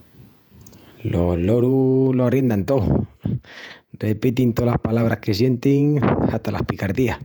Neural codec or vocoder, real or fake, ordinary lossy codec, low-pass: vocoder, 48 kHz, 128 mel bands, Vocos; fake; none; 19.8 kHz